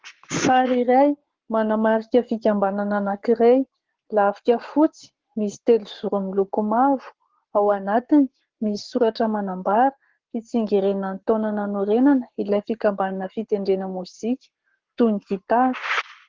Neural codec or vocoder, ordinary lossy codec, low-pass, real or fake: codec, 44.1 kHz, 7.8 kbps, Pupu-Codec; Opus, 16 kbps; 7.2 kHz; fake